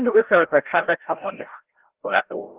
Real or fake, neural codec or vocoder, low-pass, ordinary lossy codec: fake; codec, 16 kHz, 0.5 kbps, FreqCodec, larger model; 3.6 kHz; Opus, 16 kbps